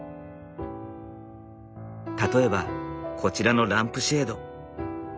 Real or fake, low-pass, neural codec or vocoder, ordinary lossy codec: real; none; none; none